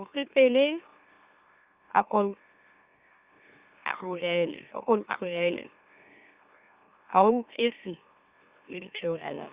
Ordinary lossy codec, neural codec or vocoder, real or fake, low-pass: Opus, 64 kbps; autoencoder, 44.1 kHz, a latent of 192 numbers a frame, MeloTTS; fake; 3.6 kHz